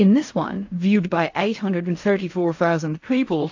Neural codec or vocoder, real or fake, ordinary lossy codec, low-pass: codec, 16 kHz in and 24 kHz out, 0.4 kbps, LongCat-Audio-Codec, fine tuned four codebook decoder; fake; AAC, 48 kbps; 7.2 kHz